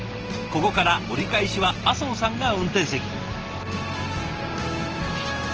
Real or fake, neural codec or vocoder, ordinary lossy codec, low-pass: real; none; Opus, 16 kbps; 7.2 kHz